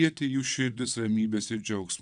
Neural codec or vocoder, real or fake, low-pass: vocoder, 22.05 kHz, 80 mel bands, WaveNeXt; fake; 9.9 kHz